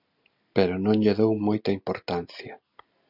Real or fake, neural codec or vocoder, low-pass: real; none; 5.4 kHz